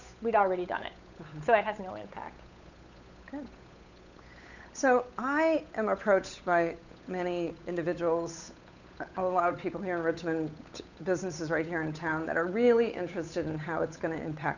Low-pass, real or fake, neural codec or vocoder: 7.2 kHz; fake; codec, 16 kHz, 8 kbps, FunCodec, trained on Chinese and English, 25 frames a second